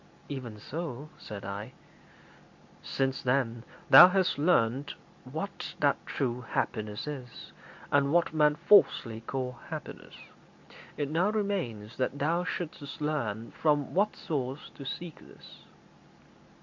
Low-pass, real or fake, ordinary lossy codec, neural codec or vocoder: 7.2 kHz; real; MP3, 48 kbps; none